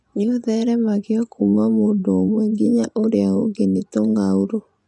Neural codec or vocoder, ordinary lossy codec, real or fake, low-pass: vocoder, 24 kHz, 100 mel bands, Vocos; none; fake; 10.8 kHz